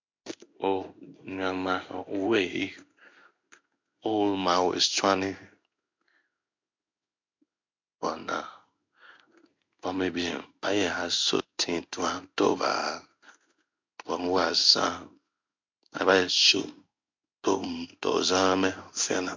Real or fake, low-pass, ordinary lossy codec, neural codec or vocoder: fake; 7.2 kHz; MP3, 64 kbps; codec, 16 kHz in and 24 kHz out, 1 kbps, XY-Tokenizer